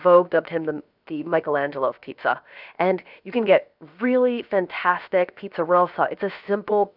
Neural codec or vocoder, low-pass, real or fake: codec, 16 kHz, 0.7 kbps, FocalCodec; 5.4 kHz; fake